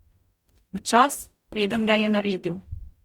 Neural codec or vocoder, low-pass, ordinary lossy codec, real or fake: codec, 44.1 kHz, 0.9 kbps, DAC; 19.8 kHz; Opus, 64 kbps; fake